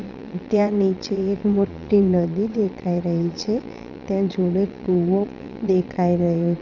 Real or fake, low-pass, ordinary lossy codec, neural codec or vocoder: fake; 7.2 kHz; none; vocoder, 22.05 kHz, 80 mel bands, Vocos